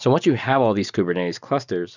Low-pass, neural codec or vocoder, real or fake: 7.2 kHz; none; real